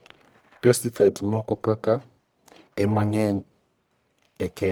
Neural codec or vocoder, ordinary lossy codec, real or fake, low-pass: codec, 44.1 kHz, 1.7 kbps, Pupu-Codec; none; fake; none